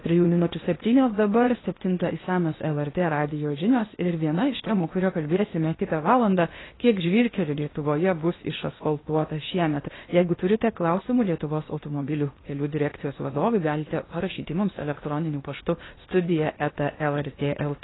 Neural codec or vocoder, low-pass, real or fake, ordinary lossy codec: codec, 16 kHz in and 24 kHz out, 0.8 kbps, FocalCodec, streaming, 65536 codes; 7.2 kHz; fake; AAC, 16 kbps